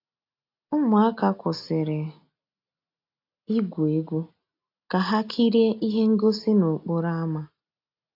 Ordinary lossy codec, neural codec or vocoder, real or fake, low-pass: AAC, 32 kbps; none; real; 5.4 kHz